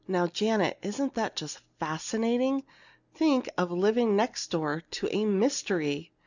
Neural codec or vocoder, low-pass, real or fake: none; 7.2 kHz; real